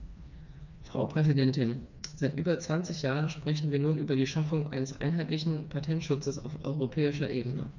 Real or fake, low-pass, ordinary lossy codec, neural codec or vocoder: fake; 7.2 kHz; none; codec, 16 kHz, 2 kbps, FreqCodec, smaller model